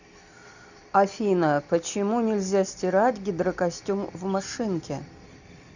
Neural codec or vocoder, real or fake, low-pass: none; real; 7.2 kHz